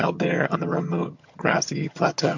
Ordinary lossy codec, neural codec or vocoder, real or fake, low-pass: MP3, 48 kbps; vocoder, 22.05 kHz, 80 mel bands, HiFi-GAN; fake; 7.2 kHz